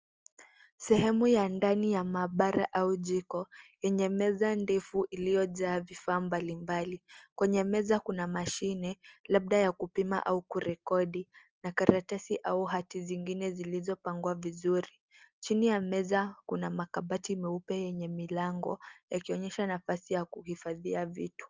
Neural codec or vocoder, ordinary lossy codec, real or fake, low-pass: none; Opus, 24 kbps; real; 7.2 kHz